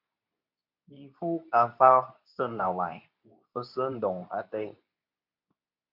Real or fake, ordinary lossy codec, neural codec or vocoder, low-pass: fake; MP3, 48 kbps; codec, 24 kHz, 0.9 kbps, WavTokenizer, medium speech release version 2; 5.4 kHz